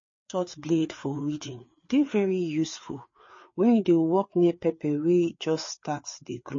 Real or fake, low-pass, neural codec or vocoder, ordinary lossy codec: fake; 7.2 kHz; codec, 16 kHz, 4 kbps, FreqCodec, larger model; MP3, 32 kbps